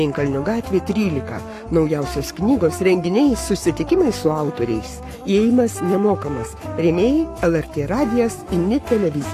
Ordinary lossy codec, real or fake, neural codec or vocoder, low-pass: AAC, 64 kbps; fake; codec, 44.1 kHz, 7.8 kbps, Pupu-Codec; 14.4 kHz